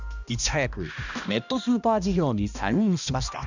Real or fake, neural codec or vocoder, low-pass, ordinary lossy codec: fake; codec, 16 kHz, 1 kbps, X-Codec, HuBERT features, trained on balanced general audio; 7.2 kHz; none